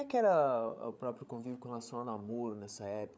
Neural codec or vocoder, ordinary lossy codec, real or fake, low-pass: codec, 16 kHz, 16 kbps, FreqCodec, larger model; none; fake; none